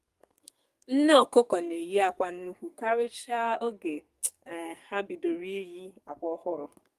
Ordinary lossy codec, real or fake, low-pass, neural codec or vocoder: Opus, 32 kbps; fake; 14.4 kHz; codec, 44.1 kHz, 2.6 kbps, SNAC